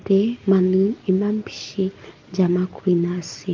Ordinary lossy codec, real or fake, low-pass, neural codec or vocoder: Opus, 32 kbps; real; 7.2 kHz; none